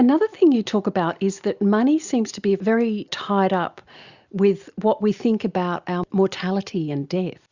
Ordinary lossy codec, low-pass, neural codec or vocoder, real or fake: Opus, 64 kbps; 7.2 kHz; none; real